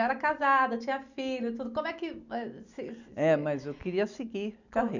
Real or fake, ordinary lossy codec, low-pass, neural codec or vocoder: real; none; 7.2 kHz; none